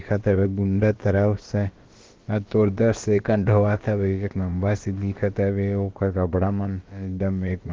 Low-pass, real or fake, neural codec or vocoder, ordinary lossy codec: 7.2 kHz; fake; codec, 16 kHz, about 1 kbps, DyCAST, with the encoder's durations; Opus, 16 kbps